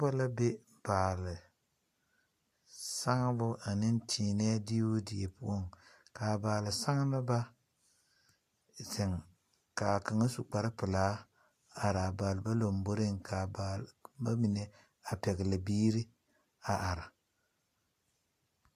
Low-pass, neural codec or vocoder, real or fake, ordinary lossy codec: 14.4 kHz; none; real; AAC, 64 kbps